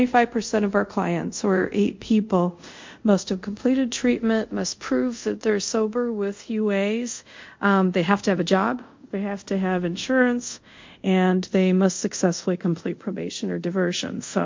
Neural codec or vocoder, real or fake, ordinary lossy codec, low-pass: codec, 24 kHz, 0.5 kbps, DualCodec; fake; MP3, 48 kbps; 7.2 kHz